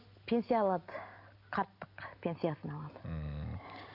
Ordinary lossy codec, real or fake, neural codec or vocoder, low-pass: none; real; none; 5.4 kHz